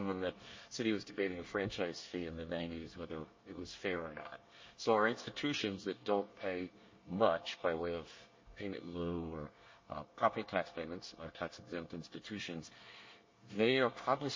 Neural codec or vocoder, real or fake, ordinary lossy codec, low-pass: codec, 24 kHz, 1 kbps, SNAC; fake; MP3, 32 kbps; 7.2 kHz